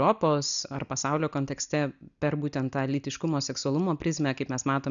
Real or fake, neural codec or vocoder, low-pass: real; none; 7.2 kHz